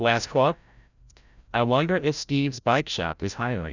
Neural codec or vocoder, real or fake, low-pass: codec, 16 kHz, 0.5 kbps, FreqCodec, larger model; fake; 7.2 kHz